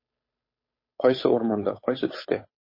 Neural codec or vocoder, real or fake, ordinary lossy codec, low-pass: codec, 16 kHz, 8 kbps, FunCodec, trained on Chinese and English, 25 frames a second; fake; MP3, 24 kbps; 5.4 kHz